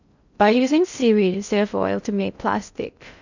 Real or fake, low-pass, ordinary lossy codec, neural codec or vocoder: fake; 7.2 kHz; none; codec, 16 kHz in and 24 kHz out, 0.6 kbps, FocalCodec, streaming, 2048 codes